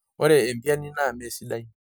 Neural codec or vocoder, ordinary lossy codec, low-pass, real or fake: none; none; none; real